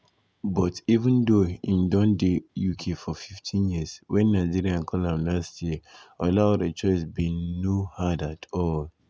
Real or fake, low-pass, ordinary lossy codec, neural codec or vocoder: real; none; none; none